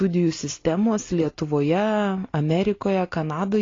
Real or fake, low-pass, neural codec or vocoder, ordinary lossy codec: real; 7.2 kHz; none; AAC, 32 kbps